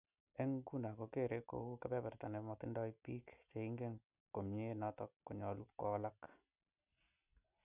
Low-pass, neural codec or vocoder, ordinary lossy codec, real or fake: 3.6 kHz; none; none; real